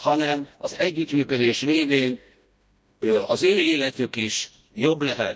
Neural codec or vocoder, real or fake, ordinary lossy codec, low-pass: codec, 16 kHz, 1 kbps, FreqCodec, smaller model; fake; none; none